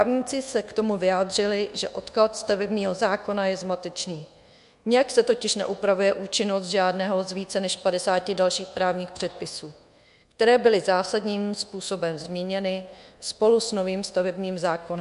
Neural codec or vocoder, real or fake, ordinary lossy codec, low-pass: codec, 24 kHz, 1.2 kbps, DualCodec; fake; MP3, 64 kbps; 10.8 kHz